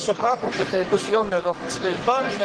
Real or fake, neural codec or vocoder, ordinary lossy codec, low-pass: fake; codec, 44.1 kHz, 1.7 kbps, Pupu-Codec; Opus, 16 kbps; 10.8 kHz